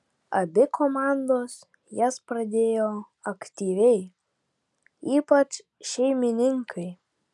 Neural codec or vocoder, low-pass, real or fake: none; 10.8 kHz; real